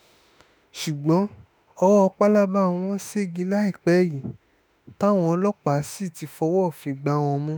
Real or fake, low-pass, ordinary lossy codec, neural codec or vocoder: fake; none; none; autoencoder, 48 kHz, 32 numbers a frame, DAC-VAE, trained on Japanese speech